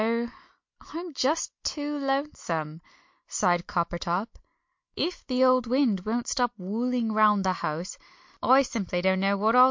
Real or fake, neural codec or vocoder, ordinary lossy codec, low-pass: real; none; MP3, 48 kbps; 7.2 kHz